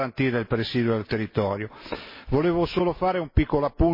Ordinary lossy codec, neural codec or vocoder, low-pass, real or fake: MP3, 24 kbps; none; 5.4 kHz; real